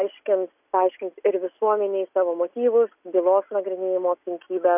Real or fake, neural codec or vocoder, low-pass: real; none; 3.6 kHz